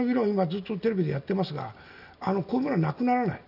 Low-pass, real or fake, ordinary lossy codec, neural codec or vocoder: 5.4 kHz; real; none; none